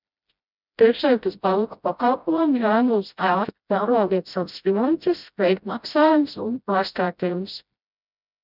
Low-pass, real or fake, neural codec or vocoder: 5.4 kHz; fake; codec, 16 kHz, 0.5 kbps, FreqCodec, smaller model